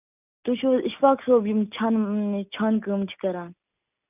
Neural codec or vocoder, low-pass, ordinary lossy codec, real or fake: none; 3.6 kHz; none; real